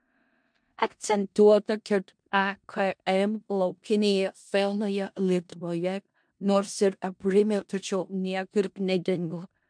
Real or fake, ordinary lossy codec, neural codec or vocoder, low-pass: fake; MP3, 64 kbps; codec, 16 kHz in and 24 kHz out, 0.4 kbps, LongCat-Audio-Codec, four codebook decoder; 9.9 kHz